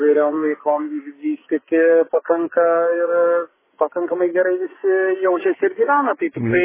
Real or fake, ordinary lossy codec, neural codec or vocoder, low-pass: fake; MP3, 16 kbps; codec, 44.1 kHz, 2.6 kbps, SNAC; 3.6 kHz